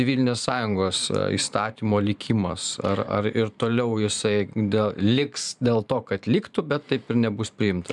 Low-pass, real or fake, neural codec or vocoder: 10.8 kHz; fake; vocoder, 44.1 kHz, 128 mel bands every 512 samples, BigVGAN v2